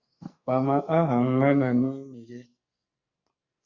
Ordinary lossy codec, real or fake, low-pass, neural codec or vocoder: Opus, 64 kbps; fake; 7.2 kHz; codec, 44.1 kHz, 2.6 kbps, SNAC